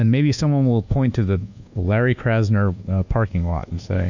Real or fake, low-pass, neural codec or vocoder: fake; 7.2 kHz; codec, 24 kHz, 1.2 kbps, DualCodec